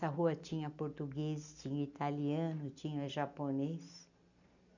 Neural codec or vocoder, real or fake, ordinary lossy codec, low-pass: none; real; none; 7.2 kHz